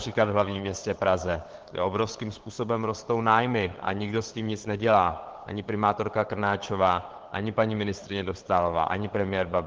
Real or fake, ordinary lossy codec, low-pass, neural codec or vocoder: fake; Opus, 16 kbps; 7.2 kHz; codec, 16 kHz, 8 kbps, FunCodec, trained on LibriTTS, 25 frames a second